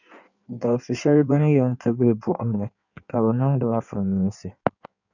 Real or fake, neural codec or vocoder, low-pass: fake; codec, 16 kHz in and 24 kHz out, 1.1 kbps, FireRedTTS-2 codec; 7.2 kHz